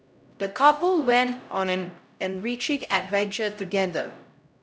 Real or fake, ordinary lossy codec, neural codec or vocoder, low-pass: fake; none; codec, 16 kHz, 0.5 kbps, X-Codec, HuBERT features, trained on LibriSpeech; none